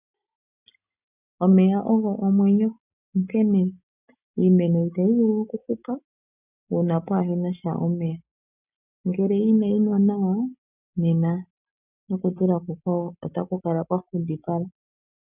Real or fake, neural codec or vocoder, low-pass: real; none; 3.6 kHz